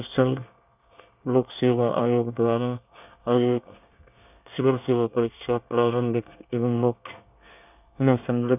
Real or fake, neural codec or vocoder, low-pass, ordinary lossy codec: fake; codec, 24 kHz, 1 kbps, SNAC; 3.6 kHz; none